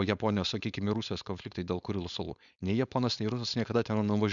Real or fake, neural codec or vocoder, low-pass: fake; codec, 16 kHz, 4.8 kbps, FACodec; 7.2 kHz